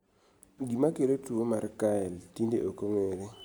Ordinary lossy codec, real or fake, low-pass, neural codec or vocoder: none; real; none; none